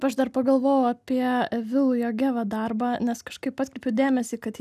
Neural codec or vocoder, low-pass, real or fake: none; 14.4 kHz; real